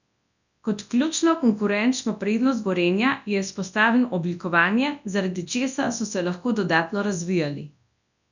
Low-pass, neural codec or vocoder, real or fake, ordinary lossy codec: 7.2 kHz; codec, 24 kHz, 0.9 kbps, WavTokenizer, large speech release; fake; none